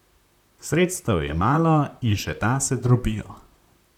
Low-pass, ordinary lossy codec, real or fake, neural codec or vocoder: 19.8 kHz; none; fake; vocoder, 44.1 kHz, 128 mel bands, Pupu-Vocoder